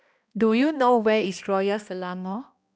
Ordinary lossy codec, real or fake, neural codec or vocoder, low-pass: none; fake; codec, 16 kHz, 1 kbps, X-Codec, HuBERT features, trained on balanced general audio; none